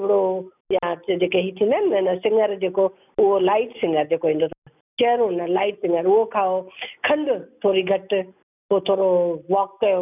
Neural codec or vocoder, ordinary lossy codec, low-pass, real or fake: none; none; 3.6 kHz; real